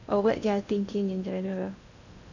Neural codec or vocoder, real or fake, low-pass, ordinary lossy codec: codec, 16 kHz in and 24 kHz out, 0.8 kbps, FocalCodec, streaming, 65536 codes; fake; 7.2 kHz; none